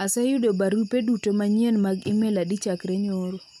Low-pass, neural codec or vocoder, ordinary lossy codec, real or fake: 19.8 kHz; none; none; real